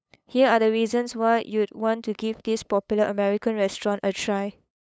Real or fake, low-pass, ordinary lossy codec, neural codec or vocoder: fake; none; none; codec, 16 kHz, 8 kbps, FunCodec, trained on LibriTTS, 25 frames a second